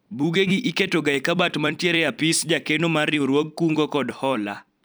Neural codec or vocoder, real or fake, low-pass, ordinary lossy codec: vocoder, 44.1 kHz, 128 mel bands every 256 samples, BigVGAN v2; fake; none; none